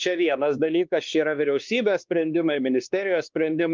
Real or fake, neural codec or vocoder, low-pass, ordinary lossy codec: fake; codec, 16 kHz, 2 kbps, X-Codec, WavLM features, trained on Multilingual LibriSpeech; 7.2 kHz; Opus, 32 kbps